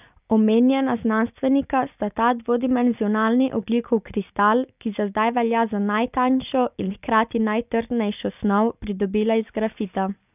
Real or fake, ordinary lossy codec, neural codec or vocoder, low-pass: real; none; none; 3.6 kHz